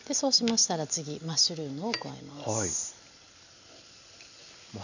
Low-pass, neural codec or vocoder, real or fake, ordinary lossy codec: 7.2 kHz; none; real; none